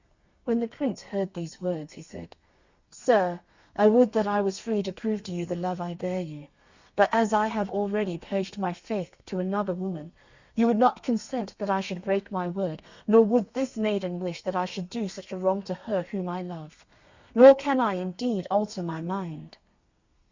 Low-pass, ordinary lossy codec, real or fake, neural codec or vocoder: 7.2 kHz; Opus, 64 kbps; fake; codec, 32 kHz, 1.9 kbps, SNAC